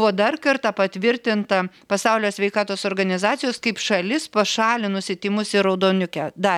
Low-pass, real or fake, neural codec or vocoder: 19.8 kHz; real; none